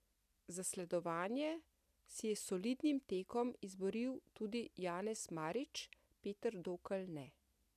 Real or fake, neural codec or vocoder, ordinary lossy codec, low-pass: real; none; none; 14.4 kHz